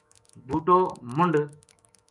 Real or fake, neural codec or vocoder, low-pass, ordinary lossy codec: fake; autoencoder, 48 kHz, 128 numbers a frame, DAC-VAE, trained on Japanese speech; 10.8 kHz; MP3, 96 kbps